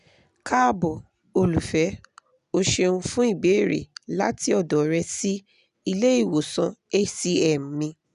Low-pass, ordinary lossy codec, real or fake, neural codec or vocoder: 10.8 kHz; none; real; none